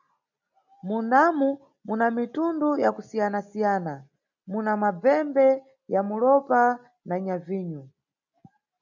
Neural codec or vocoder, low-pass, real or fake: none; 7.2 kHz; real